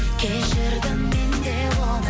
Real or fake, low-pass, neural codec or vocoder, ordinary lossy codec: real; none; none; none